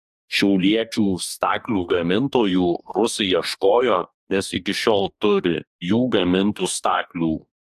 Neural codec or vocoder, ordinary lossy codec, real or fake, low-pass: codec, 44.1 kHz, 2.6 kbps, DAC; AAC, 96 kbps; fake; 14.4 kHz